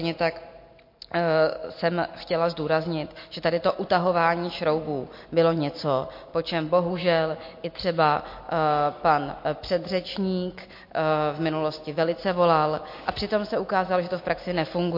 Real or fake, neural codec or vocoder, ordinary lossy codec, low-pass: real; none; MP3, 32 kbps; 5.4 kHz